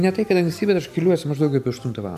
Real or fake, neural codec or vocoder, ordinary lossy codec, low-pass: real; none; MP3, 96 kbps; 14.4 kHz